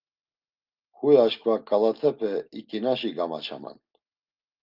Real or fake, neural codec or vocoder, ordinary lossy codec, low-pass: real; none; Opus, 32 kbps; 5.4 kHz